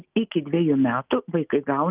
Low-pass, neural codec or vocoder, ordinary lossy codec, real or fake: 3.6 kHz; none; Opus, 24 kbps; real